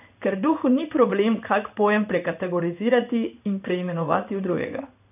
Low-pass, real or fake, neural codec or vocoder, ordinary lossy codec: 3.6 kHz; fake; codec, 16 kHz in and 24 kHz out, 1 kbps, XY-Tokenizer; none